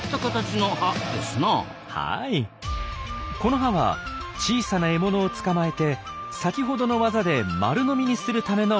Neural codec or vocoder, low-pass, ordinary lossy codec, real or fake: none; none; none; real